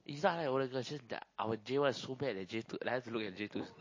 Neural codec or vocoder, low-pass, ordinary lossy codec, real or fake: none; 7.2 kHz; MP3, 32 kbps; real